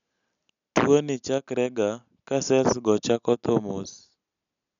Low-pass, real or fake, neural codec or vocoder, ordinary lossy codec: 7.2 kHz; real; none; none